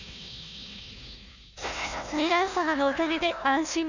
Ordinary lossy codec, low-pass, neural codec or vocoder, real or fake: none; 7.2 kHz; codec, 16 kHz, 1 kbps, FunCodec, trained on Chinese and English, 50 frames a second; fake